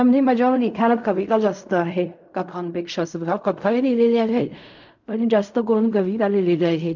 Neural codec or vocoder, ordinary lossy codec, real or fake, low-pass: codec, 16 kHz in and 24 kHz out, 0.4 kbps, LongCat-Audio-Codec, fine tuned four codebook decoder; none; fake; 7.2 kHz